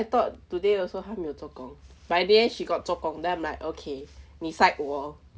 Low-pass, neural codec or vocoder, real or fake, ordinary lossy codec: none; none; real; none